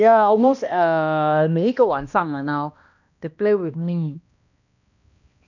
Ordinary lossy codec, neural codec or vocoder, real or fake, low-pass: none; codec, 16 kHz, 1 kbps, X-Codec, HuBERT features, trained on balanced general audio; fake; 7.2 kHz